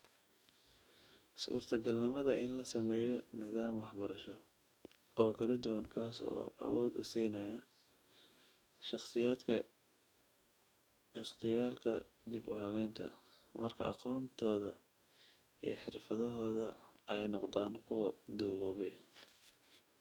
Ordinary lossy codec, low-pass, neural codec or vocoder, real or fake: none; 19.8 kHz; codec, 44.1 kHz, 2.6 kbps, DAC; fake